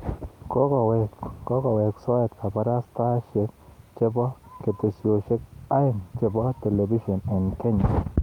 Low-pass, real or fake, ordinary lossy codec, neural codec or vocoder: 19.8 kHz; real; Opus, 32 kbps; none